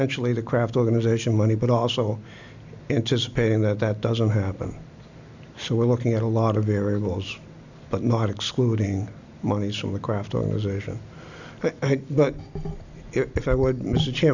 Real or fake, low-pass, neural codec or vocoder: real; 7.2 kHz; none